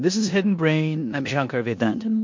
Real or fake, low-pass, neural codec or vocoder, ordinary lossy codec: fake; 7.2 kHz; codec, 16 kHz in and 24 kHz out, 0.9 kbps, LongCat-Audio-Codec, four codebook decoder; MP3, 48 kbps